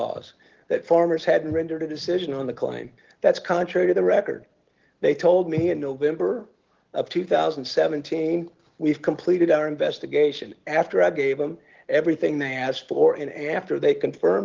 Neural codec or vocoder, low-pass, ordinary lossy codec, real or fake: none; 7.2 kHz; Opus, 16 kbps; real